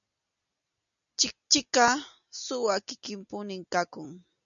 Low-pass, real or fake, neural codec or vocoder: 7.2 kHz; real; none